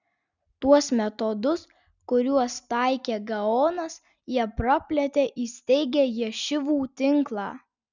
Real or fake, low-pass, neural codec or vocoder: real; 7.2 kHz; none